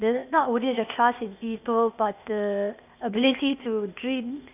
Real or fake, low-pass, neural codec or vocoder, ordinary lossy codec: fake; 3.6 kHz; codec, 16 kHz, 0.8 kbps, ZipCodec; none